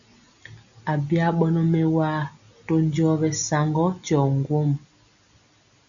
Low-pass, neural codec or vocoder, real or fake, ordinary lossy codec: 7.2 kHz; none; real; MP3, 96 kbps